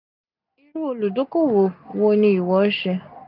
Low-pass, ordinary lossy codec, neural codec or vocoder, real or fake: 5.4 kHz; none; none; real